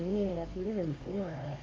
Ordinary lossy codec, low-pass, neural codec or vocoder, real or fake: none; none; codec, 16 kHz, 2 kbps, X-Codec, HuBERT features, trained on LibriSpeech; fake